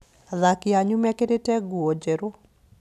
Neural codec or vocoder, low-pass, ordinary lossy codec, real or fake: none; 14.4 kHz; none; real